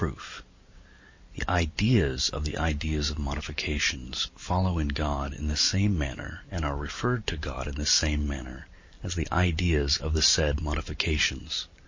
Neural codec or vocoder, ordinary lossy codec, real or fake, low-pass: vocoder, 44.1 kHz, 128 mel bands every 512 samples, BigVGAN v2; MP3, 32 kbps; fake; 7.2 kHz